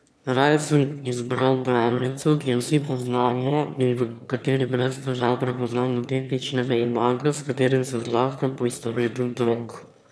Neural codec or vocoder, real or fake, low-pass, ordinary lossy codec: autoencoder, 22.05 kHz, a latent of 192 numbers a frame, VITS, trained on one speaker; fake; none; none